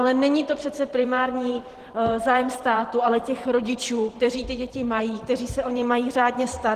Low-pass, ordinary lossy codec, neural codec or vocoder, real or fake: 14.4 kHz; Opus, 16 kbps; vocoder, 44.1 kHz, 128 mel bands every 512 samples, BigVGAN v2; fake